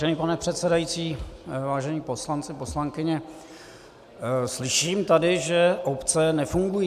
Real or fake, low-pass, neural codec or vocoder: real; 14.4 kHz; none